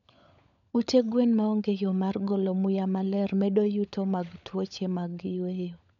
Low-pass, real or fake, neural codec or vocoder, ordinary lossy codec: 7.2 kHz; fake; codec, 16 kHz, 16 kbps, FunCodec, trained on LibriTTS, 50 frames a second; none